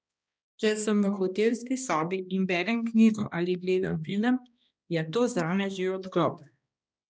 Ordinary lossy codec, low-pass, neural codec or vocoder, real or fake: none; none; codec, 16 kHz, 1 kbps, X-Codec, HuBERT features, trained on balanced general audio; fake